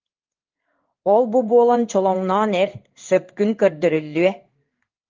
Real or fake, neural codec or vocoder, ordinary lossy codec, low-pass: fake; codec, 16 kHz in and 24 kHz out, 1 kbps, XY-Tokenizer; Opus, 32 kbps; 7.2 kHz